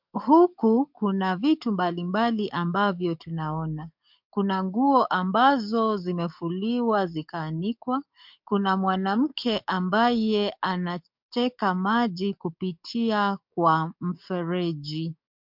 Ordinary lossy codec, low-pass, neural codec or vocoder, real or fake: MP3, 48 kbps; 5.4 kHz; codec, 44.1 kHz, 7.8 kbps, DAC; fake